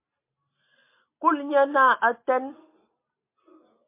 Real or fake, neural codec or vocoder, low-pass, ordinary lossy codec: real; none; 3.6 kHz; MP3, 24 kbps